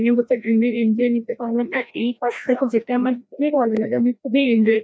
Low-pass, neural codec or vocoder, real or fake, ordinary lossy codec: none; codec, 16 kHz, 1 kbps, FreqCodec, larger model; fake; none